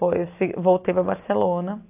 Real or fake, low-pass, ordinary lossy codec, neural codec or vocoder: real; 3.6 kHz; AAC, 24 kbps; none